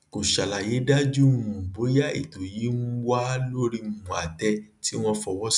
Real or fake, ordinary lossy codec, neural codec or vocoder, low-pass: real; none; none; 10.8 kHz